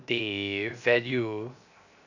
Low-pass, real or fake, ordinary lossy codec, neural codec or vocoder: 7.2 kHz; fake; none; codec, 16 kHz, 0.7 kbps, FocalCodec